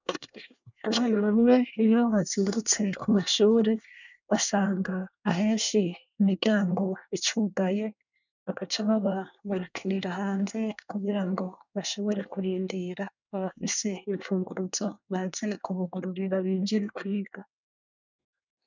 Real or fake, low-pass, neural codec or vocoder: fake; 7.2 kHz; codec, 24 kHz, 1 kbps, SNAC